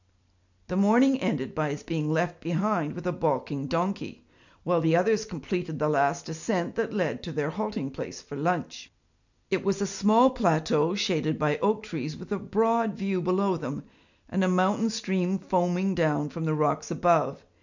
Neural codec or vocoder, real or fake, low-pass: none; real; 7.2 kHz